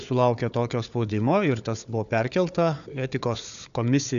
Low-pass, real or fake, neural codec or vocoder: 7.2 kHz; fake; codec, 16 kHz, 4 kbps, FunCodec, trained on Chinese and English, 50 frames a second